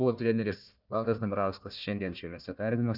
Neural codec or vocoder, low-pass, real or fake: codec, 16 kHz, 1 kbps, FunCodec, trained on Chinese and English, 50 frames a second; 5.4 kHz; fake